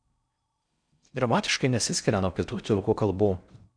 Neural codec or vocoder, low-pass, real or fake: codec, 16 kHz in and 24 kHz out, 0.6 kbps, FocalCodec, streaming, 4096 codes; 9.9 kHz; fake